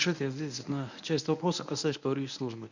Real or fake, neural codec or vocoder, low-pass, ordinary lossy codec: fake; codec, 24 kHz, 0.9 kbps, WavTokenizer, medium speech release version 1; 7.2 kHz; none